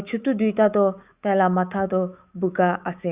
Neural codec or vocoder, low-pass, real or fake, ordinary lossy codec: autoencoder, 48 kHz, 128 numbers a frame, DAC-VAE, trained on Japanese speech; 3.6 kHz; fake; Opus, 64 kbps